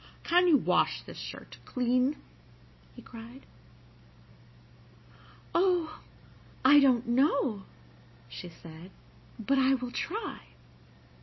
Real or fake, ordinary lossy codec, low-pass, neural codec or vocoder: real; MP3, 24 kbps; 7.2 kHz; none